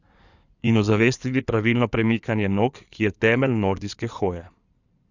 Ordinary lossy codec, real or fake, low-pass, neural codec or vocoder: none; fake; 7.2 kHz; codec, 16 kHz in and 24 kHz out, 2.2 kbps, FireRedTTS-2 codec